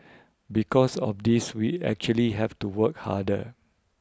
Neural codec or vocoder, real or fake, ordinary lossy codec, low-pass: none; real; none; none